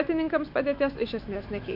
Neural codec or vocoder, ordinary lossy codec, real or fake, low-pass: autoencoder, 48 kHz, 128 numbers a frame, DAC-VAE, trained on Japanese speech; MP3, 48 kbps; fake; 5.4 kHz